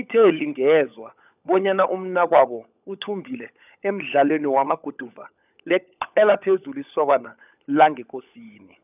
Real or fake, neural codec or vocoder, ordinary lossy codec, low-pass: fake; codec, 16 kHz, 16 kbps, FunCodec, trained on LibriTTS, 50 frames a second; none; 3.6 kHz